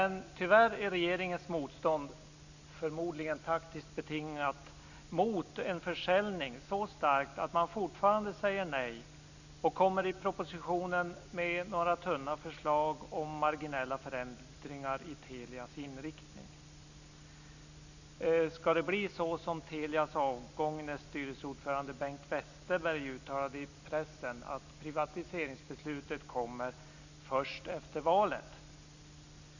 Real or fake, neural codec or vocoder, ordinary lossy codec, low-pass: real; none; none; 7.2 kHz